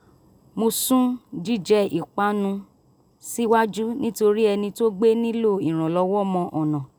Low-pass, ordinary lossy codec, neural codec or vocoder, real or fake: none; none; none; real